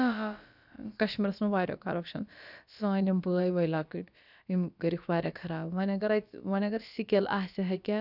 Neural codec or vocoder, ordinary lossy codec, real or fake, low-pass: codec, 16 kHz, about 1 kbps, DyCAST, with the encoder's durations; none; fake; 5.4 kHz